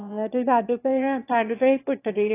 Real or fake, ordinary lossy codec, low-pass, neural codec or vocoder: fake; AAC, 24 kbps; 3.6 kHz; autoencoder, 22.05 kHz, a latent of 192 numbers a frame, VITS, trained on one speaker